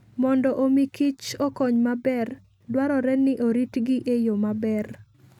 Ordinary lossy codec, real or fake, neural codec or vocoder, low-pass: none; real; none; 19.8 kHz